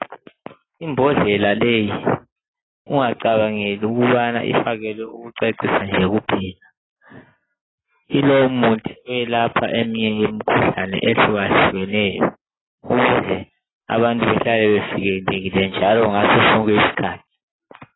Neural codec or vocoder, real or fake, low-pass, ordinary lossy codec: none; real; 7.2 kHz; AAC, 16 kbps